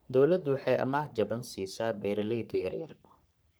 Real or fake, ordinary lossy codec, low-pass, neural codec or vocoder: fake; none; none; codec, 44.1 kHz, 3.4 kbps, Pupu-Codec